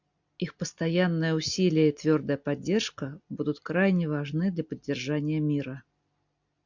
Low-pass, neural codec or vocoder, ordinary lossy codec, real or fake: 7.2 kHz; none; MP3, 64 kbps; real